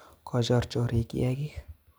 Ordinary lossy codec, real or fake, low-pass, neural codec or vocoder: none; real; none; none